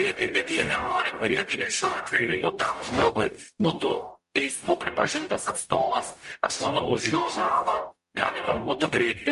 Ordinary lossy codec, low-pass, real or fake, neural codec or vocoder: MP3, 48 kbps; 14.4 kHz; fake; codec, 44.1 kHz, 0.9 kbps, DAC